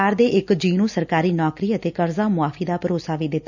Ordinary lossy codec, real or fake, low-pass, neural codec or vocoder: none; real; 7.2 kHz; none